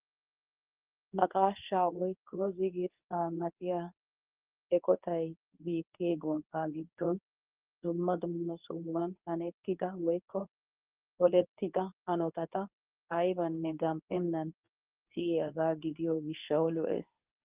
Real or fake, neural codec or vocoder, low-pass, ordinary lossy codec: fake; codec, 24 kHz, 0.9 kbps, WavTokenizer, medium speech release version 2; 3.6 kHz; Opus, 24 kbps